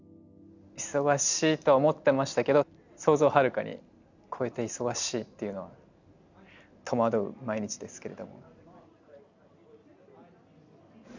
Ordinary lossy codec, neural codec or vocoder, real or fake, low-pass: none; none; real; 7.2 kHz